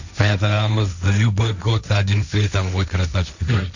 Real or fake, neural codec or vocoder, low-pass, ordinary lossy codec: fake; codec, 16 kHz, 1.1 kbps, Voila-Tokenizer; 7.2 kHz; none